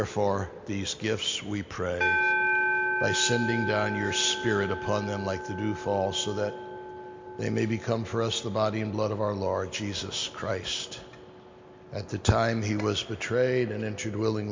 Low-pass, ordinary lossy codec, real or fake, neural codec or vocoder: 7.2 kHz; MP3, 48 kbps; real; none